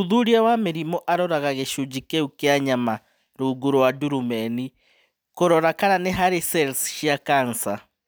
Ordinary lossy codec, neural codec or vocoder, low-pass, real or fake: none; none; none; real